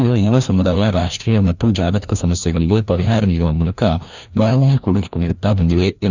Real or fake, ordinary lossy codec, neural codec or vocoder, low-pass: fake; none; codec, 16 kHz, 1 kbps, FreqCodec, larger model; 7.2 kHz